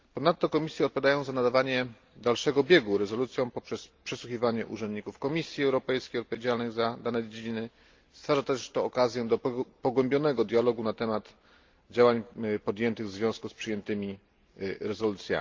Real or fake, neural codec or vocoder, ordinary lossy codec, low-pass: real; none; Opus, 24 kbps; 7.2 kHz